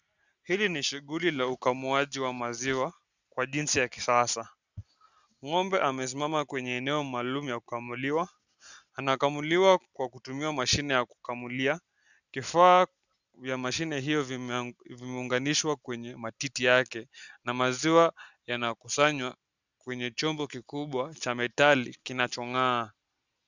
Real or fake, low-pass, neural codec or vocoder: real; 7.2 kHz; none